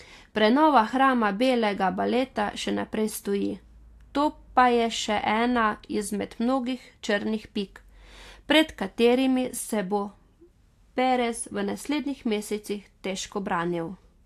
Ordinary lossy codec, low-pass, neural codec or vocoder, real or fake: AAC, 64 kbps; 14.4 kHz; none; real